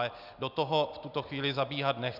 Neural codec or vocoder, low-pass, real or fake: none; 5.4 kHz; real